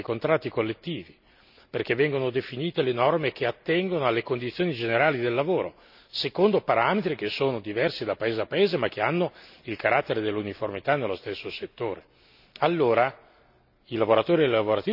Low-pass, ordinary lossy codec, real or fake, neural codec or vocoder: 5.4 kHz; none; real; none